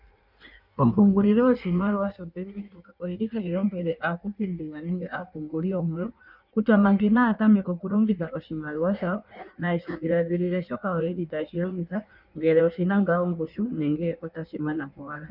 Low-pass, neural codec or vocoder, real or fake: 5.4 kHz; codec, 16 kHz in and 24 kHz out, 1.1 kbps, FireRedTTS-2 codec; fake